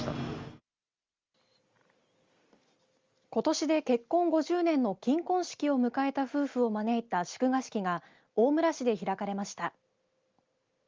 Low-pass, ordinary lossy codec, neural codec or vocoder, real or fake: 7.2 kHz; Opus, 32 kbps; none; real